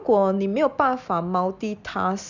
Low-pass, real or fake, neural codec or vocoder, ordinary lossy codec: 7.2 kHz; real; none; none